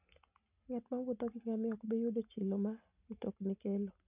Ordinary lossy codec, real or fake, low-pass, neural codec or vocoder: none; real; 3.6 kHz; none